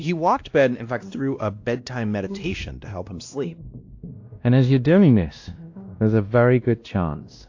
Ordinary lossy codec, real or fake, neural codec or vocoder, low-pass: AAC, 48 kbps; fake; codec, 16 kHz, 1 kbps, X-Codec, WavLM features, trained on Multilingual LibriSpeech; 7.2 kHz